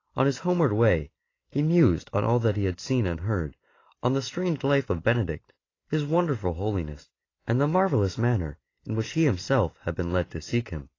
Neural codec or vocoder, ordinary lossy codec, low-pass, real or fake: none; AAC, 32 kbps; 7.2 kHz; real